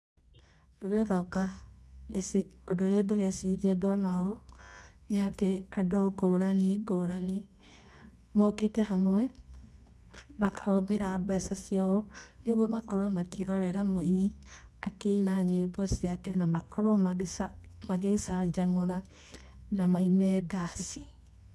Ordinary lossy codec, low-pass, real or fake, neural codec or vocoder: none; none; fake; codec, 24 kHz, 0.9 kbps, WavTokenizer, medium music audio release